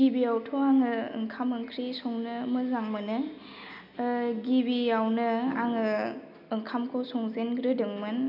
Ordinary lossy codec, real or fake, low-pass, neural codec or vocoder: none; real; 5.4 kHz; none